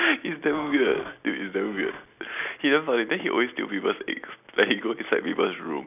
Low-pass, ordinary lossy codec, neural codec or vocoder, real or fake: 3.6 kHz; none; none; real